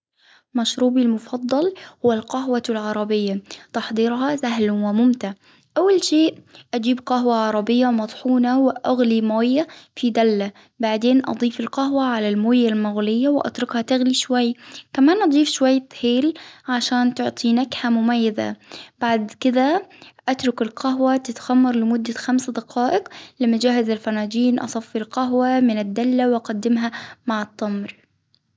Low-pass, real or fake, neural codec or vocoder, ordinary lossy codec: none; real; none; none